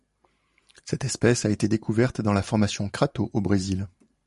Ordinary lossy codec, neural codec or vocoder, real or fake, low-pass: MP3, 48 kbps; vocoder, 44.1 kHz, 128 mel bands every 512 samples, BigVGAN v2; fake; 14.4 kHz